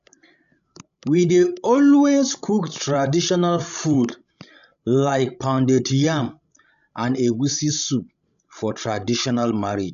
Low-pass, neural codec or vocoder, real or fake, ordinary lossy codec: 7.2 kHz; codec, 16 kHz, 16 kbps, FreqCodec, larger model; fake; none